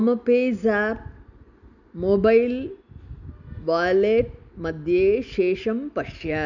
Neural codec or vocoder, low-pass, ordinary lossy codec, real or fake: none; 7.2 kHz; none; real